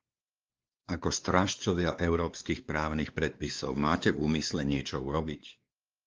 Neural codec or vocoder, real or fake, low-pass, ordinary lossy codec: codec, 16 kHz, 2 kbps, X-Codec, WavLM features, trained on Multilingual LibriSpeech; fake; 7.2 kHz; Opus, 24 kbps